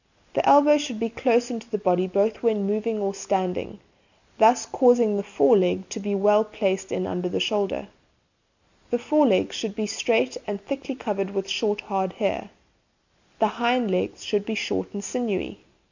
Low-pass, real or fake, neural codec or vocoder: 7.2 kHz; real; none